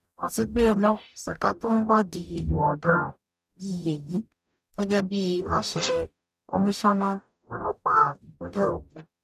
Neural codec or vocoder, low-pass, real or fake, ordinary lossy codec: codec, 44.1 kHz, 0.9 kbps, DAC; 14.4 kHz; fake; none